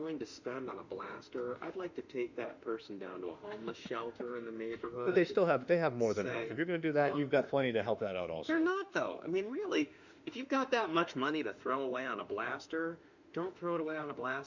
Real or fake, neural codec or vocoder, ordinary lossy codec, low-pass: fake; autoencoder, 48 kHz, 32 numbers a frame, DAC-VAE, trained on Japanese speech; Opus, 64 kbps; 7.2 kHz